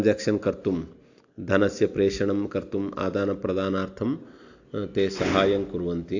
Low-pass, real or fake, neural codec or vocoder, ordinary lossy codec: 7.2 kHz; real; none; AAC, 48 kbps